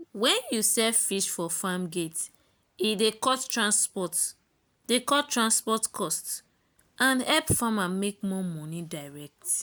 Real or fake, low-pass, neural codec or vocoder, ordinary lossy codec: real; none; none; none